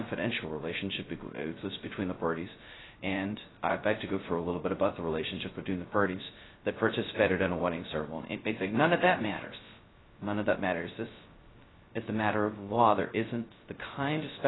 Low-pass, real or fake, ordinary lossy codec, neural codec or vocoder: 7.2 kHz; fake; AAC, 16 kbps; codec, 16 kHz, 0.2 kbps, FocalCodec